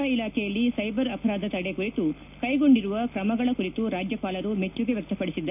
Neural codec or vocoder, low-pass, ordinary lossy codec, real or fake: none; 3.6 kHz; none; real